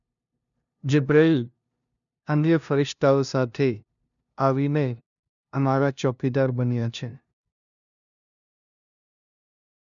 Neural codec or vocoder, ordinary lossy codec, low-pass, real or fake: codec, 16 kHz, 0.5 kbps, FunCodec, trained on LibriTTS, 25 frames a second; none; 7.2 kHz; fake